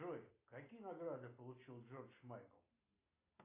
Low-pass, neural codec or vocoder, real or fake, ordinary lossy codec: 3.6 kHz; none; real; AAC, 24 kbps